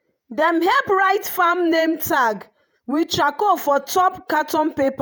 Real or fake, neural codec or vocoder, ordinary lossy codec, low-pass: fake; vocoder, 48 kHz, 128 mel bands, Vocos; none; none